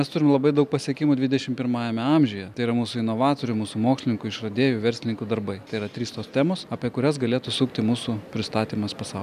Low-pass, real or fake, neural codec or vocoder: 14.4 kHz; real; none